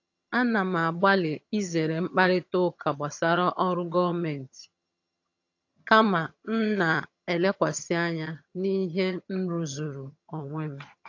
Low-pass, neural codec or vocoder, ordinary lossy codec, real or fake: 7.2 kHz; vocoder, 22.05 kHz, 80 mel bands, HiFi-GAN; AAC, 48 kbps; fake